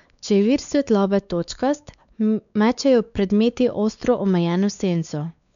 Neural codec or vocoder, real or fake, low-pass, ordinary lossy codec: codec, 16 kHz, 4 kbps, X-Codec, WavLM features, trained on Multilingual LibriSpeech; fake; 7.2 kHz; none